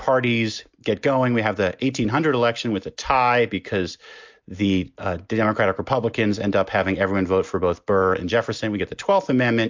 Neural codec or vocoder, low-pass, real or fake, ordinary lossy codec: none; 7.2 kHz; real; MP3, 64 kbps